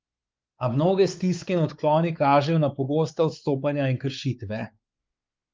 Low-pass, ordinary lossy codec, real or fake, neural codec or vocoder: 7.2 kHz; Opus, 24 kbps; fake; codec, 16 kHz, 4 kbps, X-Codec, WavLM features, trained on Multilingual LibriSpeech